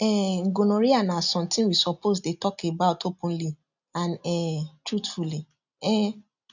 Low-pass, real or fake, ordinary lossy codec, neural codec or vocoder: 7.2 kHz; real; none; none